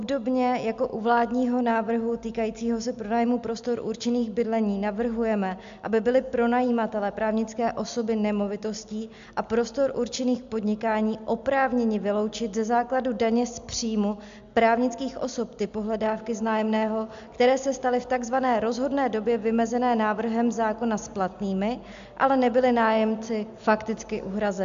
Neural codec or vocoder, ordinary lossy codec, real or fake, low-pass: none; AAC, 64 kbps; real; 7.2 kHz